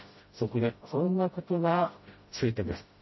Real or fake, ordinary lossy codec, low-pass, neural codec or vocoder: fake; MP3, 24 kbps; 7.2 kHz; codec, 16 kHz, 0.5 kbps, FreqCodec, smaller model